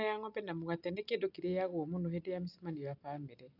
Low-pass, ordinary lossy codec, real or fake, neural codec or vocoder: 5.4 kHz; none; real; none